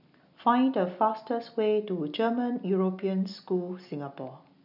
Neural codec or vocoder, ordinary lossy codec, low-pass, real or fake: none; none; 5.4 kHz; real